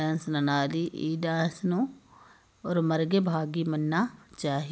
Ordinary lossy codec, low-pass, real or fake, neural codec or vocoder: none; none; real; none